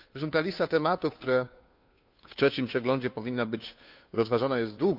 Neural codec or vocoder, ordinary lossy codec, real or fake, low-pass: codec, 16 kHz, 2 kbps, FunCodec, trained on Chinese and English, 25 frames a second; none; fake; 5.4 kHz